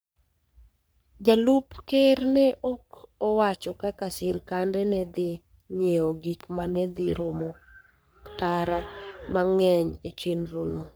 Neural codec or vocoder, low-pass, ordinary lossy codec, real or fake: codec, 44.1 kHz, 3.4 kbps, Pupu-Codec; none; none; fake